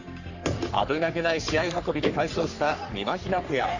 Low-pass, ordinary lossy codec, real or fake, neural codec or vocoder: 7.2 kHz; none; fake; codec, 44.1 kHz, 3.4 kbps, Pupu-Codec